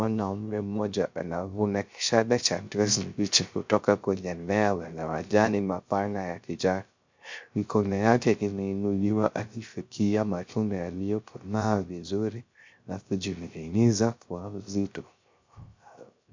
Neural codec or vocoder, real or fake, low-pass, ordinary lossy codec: codec, 16 kHz, 0.3 kbps, FocalCodec; fake; 7.2 kHz; MP3, 64 kbps